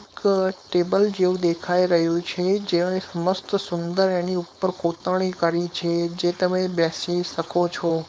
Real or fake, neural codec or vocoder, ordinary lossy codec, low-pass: fake; codec, 16 kHz, 4.8 kbps, FACodec; none; none